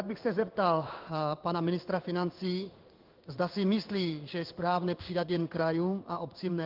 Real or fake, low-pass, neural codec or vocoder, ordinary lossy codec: fake; 5.4 kHz; codec, 16 kHz in and 24 kHz out, 1 kbps, XY-Tokenizer; Opus, 32 kbps